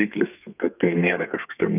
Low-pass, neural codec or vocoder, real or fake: 3.6 kHz; codec, 32 kHz, 1.9 kbps, SNAC; fake